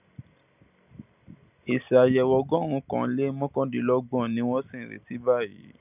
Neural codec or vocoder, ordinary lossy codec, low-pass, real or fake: none; none; 3.6 kHz; real